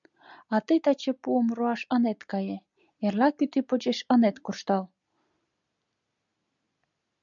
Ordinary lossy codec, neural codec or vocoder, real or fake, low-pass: MP3, 96 kbps; none; real; 7.2 kHz